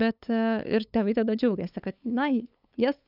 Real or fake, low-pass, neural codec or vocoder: fake; 5.4 kHz; codec, 16 kHz, 8 kbps, FunCodec, trained on LibriTTS, 25 frames a second